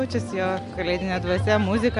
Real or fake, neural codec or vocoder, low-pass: real; none; 10.8 kHz